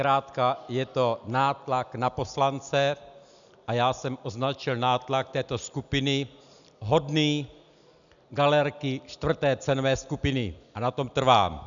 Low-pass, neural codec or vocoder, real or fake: 7.2 kHz; none; real